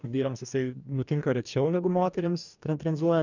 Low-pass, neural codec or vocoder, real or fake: 7.2 kHz; codec, 44.1 kHz, 2.6 kbps, DAC; fake